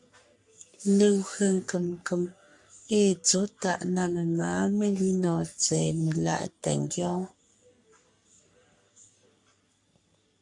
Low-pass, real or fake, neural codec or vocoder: 10.8 kHz; fake; codec, 44.1 kHz, 3.4 kbps, Pupu-Codec